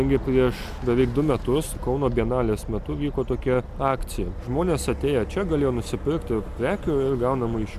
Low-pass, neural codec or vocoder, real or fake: 14.4 kHz; none; real